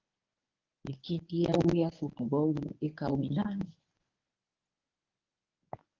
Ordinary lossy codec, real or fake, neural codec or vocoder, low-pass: Opus, 32 kbps; fake; codec, 24 kHz, 0.9 kbps, WavTokenizer, medium speech release version 1; 7.2 kHz